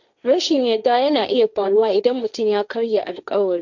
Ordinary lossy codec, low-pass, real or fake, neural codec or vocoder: none; 7.2 kHz; fake; codec, 16 kHz, 1.1 kbps, Voila-Tokenizer